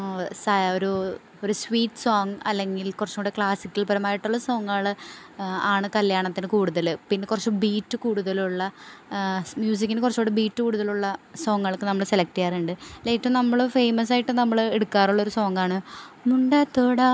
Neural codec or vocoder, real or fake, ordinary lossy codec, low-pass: none; real; none; none